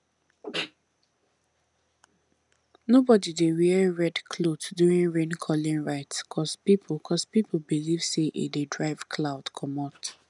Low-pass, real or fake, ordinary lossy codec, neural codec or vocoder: 10.8 kHz; real; none; none